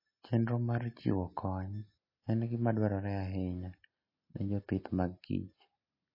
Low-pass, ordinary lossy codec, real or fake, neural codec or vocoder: 5.4 kHz; MP3, 24 kbps; real; none